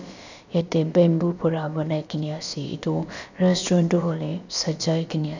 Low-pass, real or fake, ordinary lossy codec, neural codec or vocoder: 7.2 kHz; fake; none; codec, 16 kHz, about 1 kbps, DyCAST, with the encoder's durations